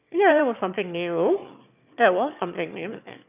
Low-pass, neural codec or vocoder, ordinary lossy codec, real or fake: 3.6 kHz; autoencoder, 22.05 kHz, a latent of 192 numbers a frame, VITS, trained on one speaker; none; fake